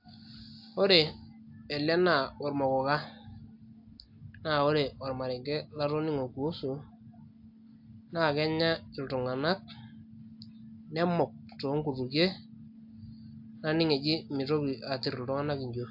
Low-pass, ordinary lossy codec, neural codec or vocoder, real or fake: 5.4 kHz; AAC, 48 kbps; none; real